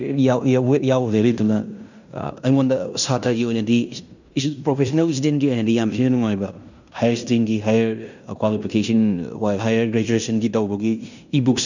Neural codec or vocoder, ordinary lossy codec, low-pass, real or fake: codec, 16 kHz in and 24 kHz out, 0.9 kbps, LongCat-Audio-Codec, fine tuned four codebook decoder; none; 7.2 kHz; fake